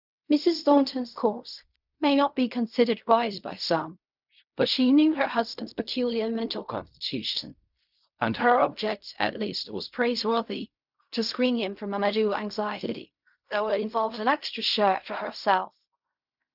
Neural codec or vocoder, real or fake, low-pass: codec, 16 kHz in and 24 kHz out, 0.4 kbps, LongCat-Audio-Codec, fine tuned four codebook decoder; fake; 5.4 kHz